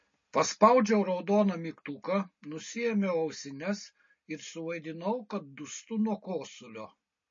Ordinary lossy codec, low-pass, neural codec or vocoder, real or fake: MP3, 32 kbps; 7.2 kHz; none; real